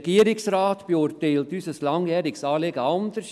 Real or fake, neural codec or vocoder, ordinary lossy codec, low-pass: real; none; none; none